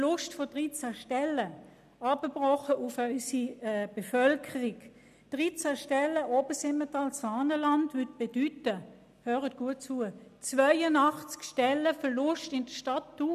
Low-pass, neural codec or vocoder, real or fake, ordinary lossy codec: 14.4 kHz; none; real; none